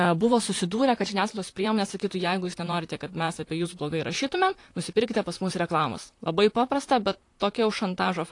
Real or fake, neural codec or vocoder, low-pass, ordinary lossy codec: fake; vocoder, 44.1 kHz, 128 mel bands, Pupu-Vocoder; 10.8 kHz; AAC, 48 kbps